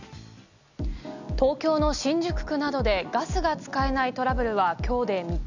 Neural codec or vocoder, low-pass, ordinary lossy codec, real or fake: none; 7.2 kHz; none; real